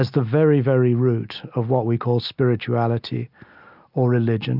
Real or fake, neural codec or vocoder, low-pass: real; none; 5.4 kHz